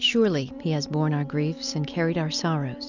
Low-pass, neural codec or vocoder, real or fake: 7.2 kHz; none; real